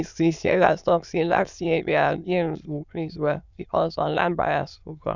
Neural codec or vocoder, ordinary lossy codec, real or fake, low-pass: autoencoder, 22.05 kHz, a latent of 192 numbers a frame, VITS, trained on many speakers; none; fake; 7.2 kHz